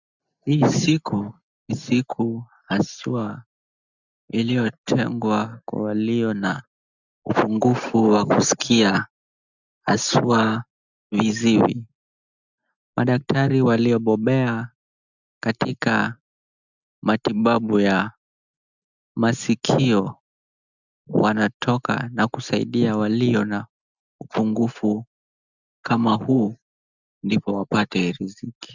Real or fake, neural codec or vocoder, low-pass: real; none; 7.2 kHz